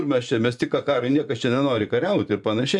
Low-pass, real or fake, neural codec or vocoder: 10.8 kHz; fake; vocoder, 24 kHz, 100 mel bands, Vocos